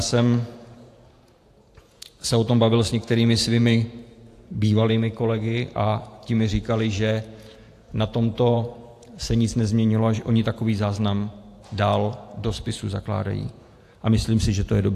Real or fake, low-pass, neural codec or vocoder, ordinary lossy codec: fake; 14.4 kHz; vocoder, 48 kHz, 128 mel bands, Vocos; AAC, 64 kbps